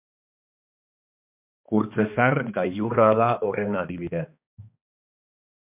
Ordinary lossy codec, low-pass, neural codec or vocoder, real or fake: MP3, 32 kbps; 3.6 kHz; codec, 16 kHz, 2 kbps, X-Codec, HuBERT features, trained on balanced general audio; fake